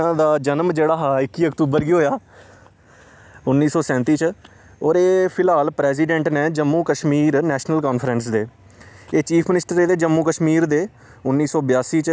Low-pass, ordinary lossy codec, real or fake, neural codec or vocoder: none; none; real; none